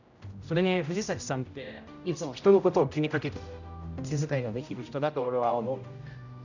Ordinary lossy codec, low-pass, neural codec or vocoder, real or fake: none; 7.2 kHz; codec, 16 kHz, 0.5 kbps, X-Codec, HuBERT features, trained on general audio; fake